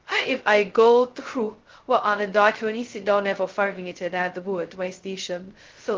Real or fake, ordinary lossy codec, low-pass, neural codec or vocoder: fake; Opus, 16 kbps; 7.2 kHz; codec, 16 kHz, 0.2 kbps, FocalCodec